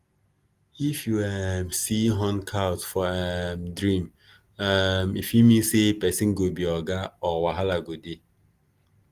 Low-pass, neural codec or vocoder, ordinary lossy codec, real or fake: 14.4 kHz; none; Opus, 24 kbps; real